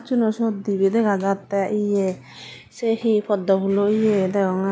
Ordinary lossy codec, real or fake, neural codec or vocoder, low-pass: none; real; none; none